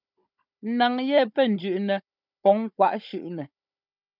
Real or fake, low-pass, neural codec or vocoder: fake; 5.4 kHz; codec, 16 kHz, 16 kbps, FunCodec, trained on Chinese and English, 50 frames a second